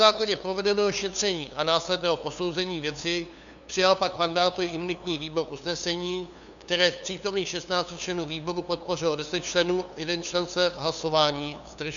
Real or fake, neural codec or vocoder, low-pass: fake; codec, 16 kHz, 2 kbps, FunCodec, trained on LibriTTS, 25 frames a second; 7.2 kHz